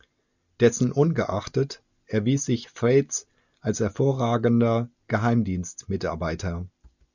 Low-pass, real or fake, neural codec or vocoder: 7.2 kHz; real; none